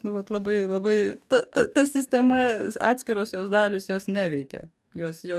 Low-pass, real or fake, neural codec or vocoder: 14.4 kHz; fake; codec, 44.1 kHz, 2.6 kbps, DAC